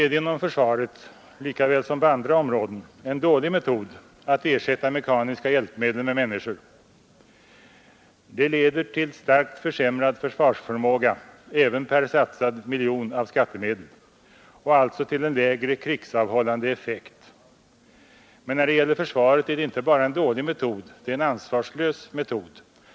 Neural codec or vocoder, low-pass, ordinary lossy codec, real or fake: none; none; none; real